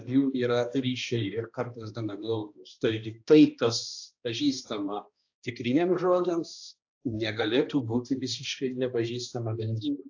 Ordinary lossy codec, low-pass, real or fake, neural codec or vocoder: AAC, 48 kbps; 7.2 kHz; fake; codec, 16 kHz, 1 kbps, X-Codec, HuBERT features, trained on balanced general audio